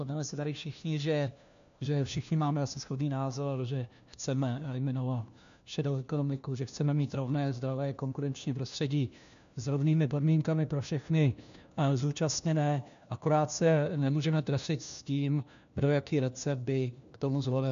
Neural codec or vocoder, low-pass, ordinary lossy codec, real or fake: codec, 16 kHz, 1 kbps, FunCodec, trained on LibriTTS, 50 frames a second; 7.2 kHz; AAC, 96 kbps; fake